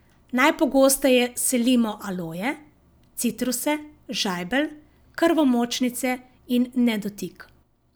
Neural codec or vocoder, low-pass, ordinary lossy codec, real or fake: none; none; none; real